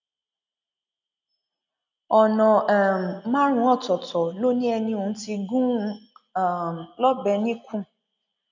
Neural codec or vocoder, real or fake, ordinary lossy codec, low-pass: none; real; none; 7.2 kHz